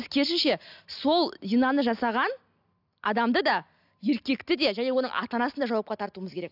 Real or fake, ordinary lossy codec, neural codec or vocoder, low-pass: real; AAC, 48 kbps; none; 5.4 kHz